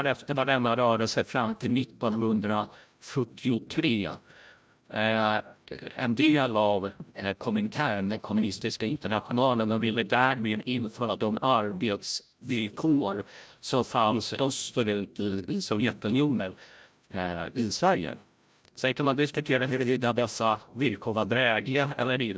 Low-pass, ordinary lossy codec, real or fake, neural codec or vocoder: none; none; fake; codec, 16 kHz, 0.5 kbps, FreqCodec, larger model